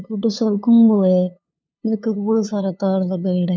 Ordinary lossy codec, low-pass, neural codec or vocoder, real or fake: none; none; codec, 16 kHz, 2 kbps, FunCodec, trained on LibriTTS, 25 frames a second; fake